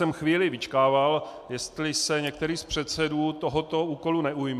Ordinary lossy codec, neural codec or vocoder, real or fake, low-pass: MP3, 96 kbps; none; real; 14.4 kHz